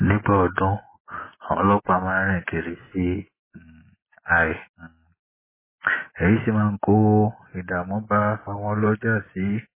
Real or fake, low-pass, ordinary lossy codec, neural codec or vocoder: real; 3.6 kHz; MP3, 16 kbps; none